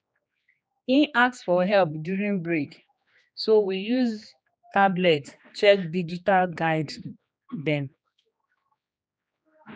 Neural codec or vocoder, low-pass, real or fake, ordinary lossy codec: codec, 16 kHz, 2 kbps, X-Codec, HuBERT features, trained on general audio; none; fake; none